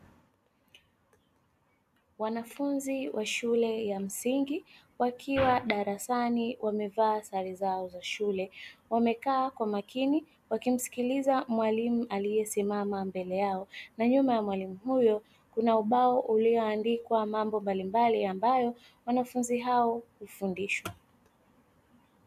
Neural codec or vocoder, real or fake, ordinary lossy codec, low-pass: none; real; AAC, 96 kbps; 14.4 kHz